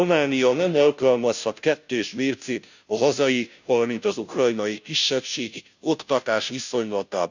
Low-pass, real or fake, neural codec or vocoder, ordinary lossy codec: 7.2 kHz; fake; codec, 16 kHz, 0.5 kbps, FunCodec, trained on Chinese and English, 25 frames a second; none